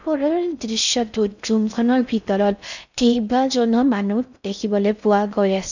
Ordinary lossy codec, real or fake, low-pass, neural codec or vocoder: none; fake; 7.2 kHz; codec, 16 kHz in and 24 kHz out, 0.6 kbps, FocalCodec, streaming, 4096 codes